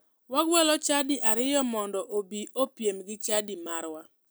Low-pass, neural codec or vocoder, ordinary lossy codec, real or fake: none; none; none; real